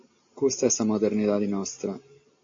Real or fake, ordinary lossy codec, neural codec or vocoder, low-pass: real; AAC, 48 kbps; none; 7.2 kHz